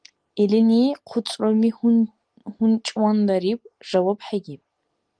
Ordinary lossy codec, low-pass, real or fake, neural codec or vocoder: Opus, 24 kbps; 9.9 kHz; real; none